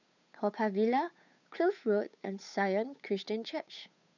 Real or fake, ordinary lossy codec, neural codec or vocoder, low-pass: fake; none; codec, 16 kHz, 8 kbps, FunCodec, trained on Chinese and English, 25 frames a second; 7.2 kHz